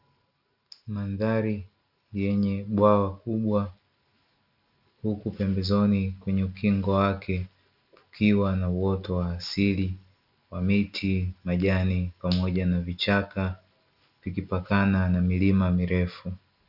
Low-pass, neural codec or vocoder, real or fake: 5.4 kHz; none; real